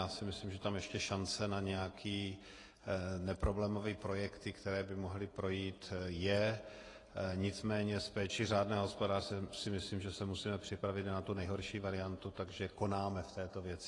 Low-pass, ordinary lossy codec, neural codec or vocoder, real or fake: 10.8 kHz; AAC, 32 kbps; vocoder, 48 kHz, 128 mel bands, Vocos; fake